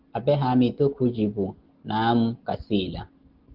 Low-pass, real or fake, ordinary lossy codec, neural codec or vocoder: 5.4 kHz; real; Opus, 16 kbps; none